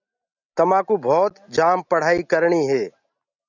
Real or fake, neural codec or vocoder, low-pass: real; none; 7.2 kHz